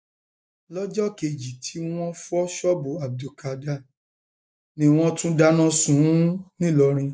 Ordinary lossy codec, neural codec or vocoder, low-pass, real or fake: none; none; none; real